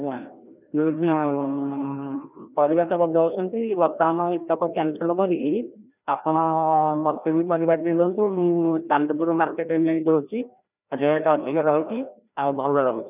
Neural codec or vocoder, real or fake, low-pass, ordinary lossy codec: codec, 16 kHz, 1 kbps, FreqCodec, larger model; fake; 3.6 kHz; none